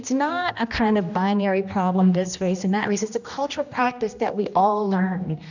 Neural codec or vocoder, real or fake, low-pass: codec, 16 kHz, 1 kbps, X-Codec, HuBERT features, trained on general audio; fake; 7.2 kHz